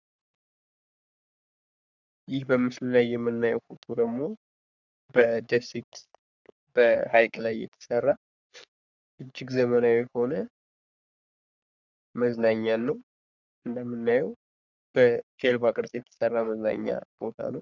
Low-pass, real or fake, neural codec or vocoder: 7.2 kHz; fake; codec, 44.1 kHz, 3.4 kbps, Pupu-Codec